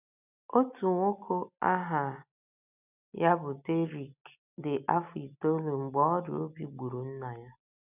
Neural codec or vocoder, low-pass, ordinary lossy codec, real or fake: none; 3.6 kHz; none; real